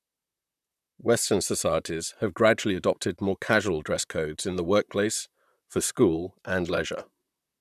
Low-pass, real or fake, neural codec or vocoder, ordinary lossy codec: 14.4 kHz; fake; vocoder, 44.1 kHz, 128 mel bands, Pupu-Vocoder; none